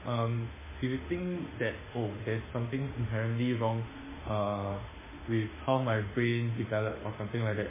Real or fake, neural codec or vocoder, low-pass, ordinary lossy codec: fake; autoencoder, 48 kHz, 32 numbers a frame, DAC-VAE, trained on Japanese speech; 3.6 kHz; MP3, 16 kbps